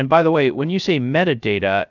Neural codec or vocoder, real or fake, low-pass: codec, 16 kHz, 0.3 kbps, FocalCodec; fake; 7.2 kHz